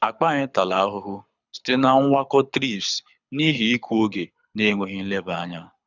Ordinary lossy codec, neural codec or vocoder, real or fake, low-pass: none; codec, 24 kHz, 6 kbps, HILCodec; fake; 7.2 kHz